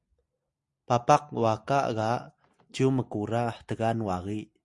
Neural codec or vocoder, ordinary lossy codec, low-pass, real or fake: none; Opus, 64 kbps; 10.8 kHz; real